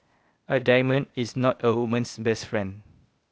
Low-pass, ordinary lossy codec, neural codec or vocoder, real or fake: none; none; codec, 16 kHz, 0.8 kbps, ZipCodec; fake